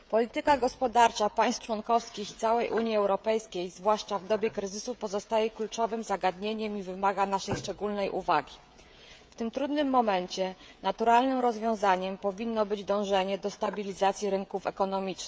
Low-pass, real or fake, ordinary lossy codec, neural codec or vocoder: none; fake; none; codec, 16 kHz, 16 kbps, FreqCodec, smaller model